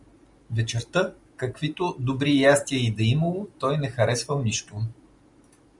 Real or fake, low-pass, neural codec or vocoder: real; 10.8 kHz; none